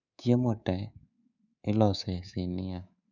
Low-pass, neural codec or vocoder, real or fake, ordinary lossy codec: 7.2 kHz; codec, 24 kHz, 3.1 kbps, DualCodec; fake; none